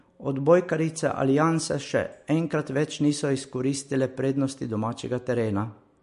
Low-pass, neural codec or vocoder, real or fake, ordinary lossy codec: 10.8 kHz; none; real; MP3, 48 kbps